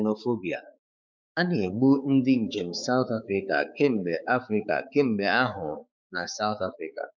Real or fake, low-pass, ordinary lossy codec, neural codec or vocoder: fake; none; none; codec, 16 kHz, 4 kbps, X-Codec, HuBERT features, trained on balanced general audio